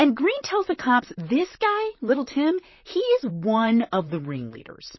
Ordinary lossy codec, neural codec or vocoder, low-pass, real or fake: MP3, 24 kbps; vocoder, 44.1 kHz, 128 mel bands, Pupu-Vocoder; 7.2 kHz; fake